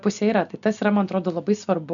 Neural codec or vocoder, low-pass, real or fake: none; 7.2 kHz; real